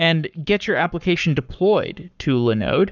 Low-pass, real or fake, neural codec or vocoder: 7.2 kHz; fake; codec, 44.1 kHz, 7.8 kbps, Pupu-Codec